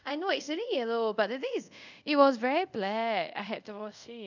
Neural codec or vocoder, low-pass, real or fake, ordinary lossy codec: codec, 24 kHz, 0.5 kbps, DualCodec; 7.2 kHz; fake; none